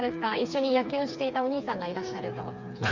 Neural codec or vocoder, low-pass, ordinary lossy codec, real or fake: codec, 16 kHz, 4 kbps, FreqCodec, smaller model; 7.2 kHz; none; fake